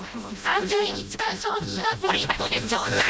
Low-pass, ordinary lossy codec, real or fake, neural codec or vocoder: none; none; fake; codec, 16 kHz, 0.5 kbps, FreqCodec, smaller model